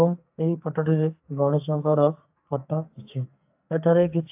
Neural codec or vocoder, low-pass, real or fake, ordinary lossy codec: codec, 44.1 kHz, 2.6 kbps, SNAC; 3.6 kHz; fake; AAC, 32 kbps